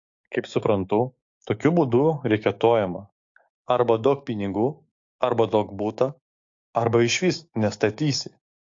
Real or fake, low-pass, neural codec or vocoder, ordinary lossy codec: fake; 7.2 kHz; codec, 16 kHz, 6 kbps, DAC; AAC, 48 kbps